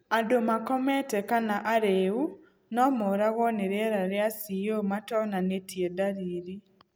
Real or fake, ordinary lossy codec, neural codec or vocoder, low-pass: real; none; none; none